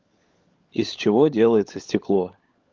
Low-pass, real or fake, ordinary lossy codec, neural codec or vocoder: 7.2 kHz; fake; Opus, 32 kbps; codec, 16 kHz, 16 kbps, FunCodec, trained on LibriTTS, 50 frames a second